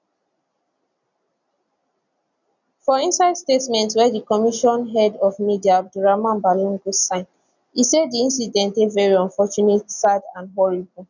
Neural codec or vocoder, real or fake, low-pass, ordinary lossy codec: none; real; 7.2 kHz; none